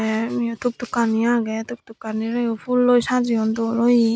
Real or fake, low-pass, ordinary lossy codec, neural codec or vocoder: real; none; none; none